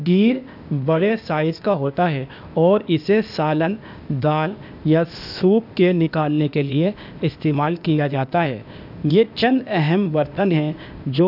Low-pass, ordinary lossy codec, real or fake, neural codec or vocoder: 5.4 kHz; none; fake; codec, 16 kHz, 0.8 kbps, ZipCodec